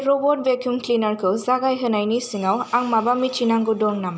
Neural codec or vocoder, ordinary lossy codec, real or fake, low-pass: none; none; real; none